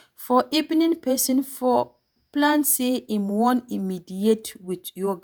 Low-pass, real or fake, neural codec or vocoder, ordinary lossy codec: none; fake; vocoder, 48 kHz, 128 mel bands, Vocos; none